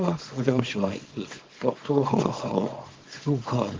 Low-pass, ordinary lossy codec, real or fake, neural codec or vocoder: 7.2 kHz; Opus, 16 kbps; fake; codec, 24 kHz, 0.9 kbps, WavTokenizer, small release